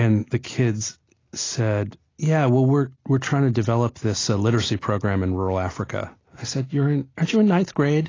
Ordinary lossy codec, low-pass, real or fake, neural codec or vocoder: AAC, 32 kbps; 7.2 kHz; real; none